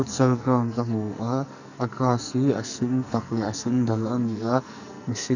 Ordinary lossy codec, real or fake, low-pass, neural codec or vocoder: none; fake; 7.2 kHz; codec, 44.1 kHz, 2.6 kbps, SNAC